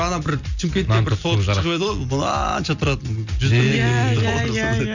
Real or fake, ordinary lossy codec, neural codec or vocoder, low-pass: real; none; none; 7.2 kHz